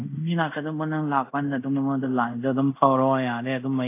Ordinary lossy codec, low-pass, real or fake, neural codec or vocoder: AAC, 24 kbps; 3.6 kHz; fake; codec, 24 kHz, 0.5 kbps, DualCodec